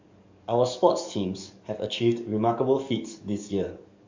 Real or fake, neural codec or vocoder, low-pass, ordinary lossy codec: fake; codec, 44.1 kHz, 7.8 kbps, DAC; 7.2 kHz; MP3, 64 kbps